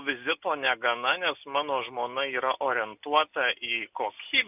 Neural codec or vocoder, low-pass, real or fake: none; 3.6 kHz; real